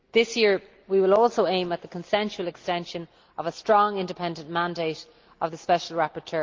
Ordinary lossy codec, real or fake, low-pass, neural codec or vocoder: Opus, 32 kbps; real; 7.2 kHz; none